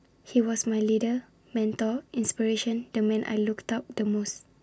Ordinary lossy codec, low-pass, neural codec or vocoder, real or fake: none; none; none; real